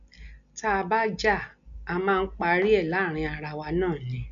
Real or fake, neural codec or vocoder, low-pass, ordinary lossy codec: real; none; 7.2 kHz; none